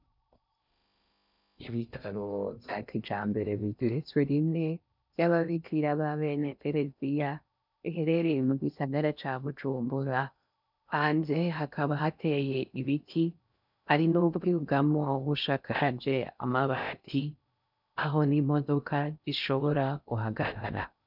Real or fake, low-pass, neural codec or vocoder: fake; 5.4 kHz; codec, 16 kHz in and 24 kHz out, 0.6 kbps, FocalCodec, streaming, 4096 codes